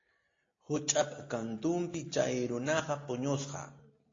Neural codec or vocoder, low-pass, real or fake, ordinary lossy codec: none; 7.2 kHz; real; AAC, 32 kbps